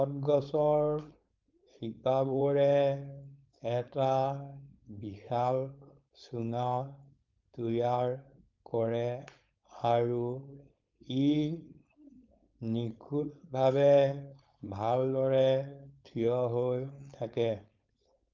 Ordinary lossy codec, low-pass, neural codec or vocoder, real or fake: Opus, 24 kbps; 7.2 kHz; codec, 16 kHz, 4.8 kbps, FACodec; fake